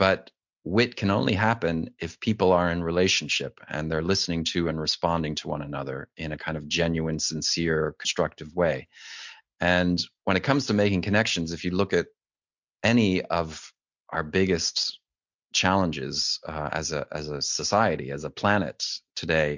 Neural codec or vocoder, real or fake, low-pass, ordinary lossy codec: none; real; 7.2 kHz; MP3, 64 kbps